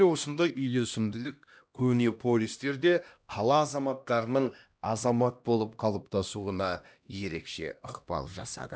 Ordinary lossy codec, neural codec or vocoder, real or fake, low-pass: none; codec, 16 kHz, 1 kbps, X-Codec, HuBERT features, trained on LibriSpeech; fake; none